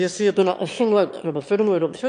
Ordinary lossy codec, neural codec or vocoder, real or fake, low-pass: MP3, 96 kbps; autoencoder, 22.05 kHz, a latent of 192 numbers a frame, VITS, trained on one speaker; fake; 9.9 kHz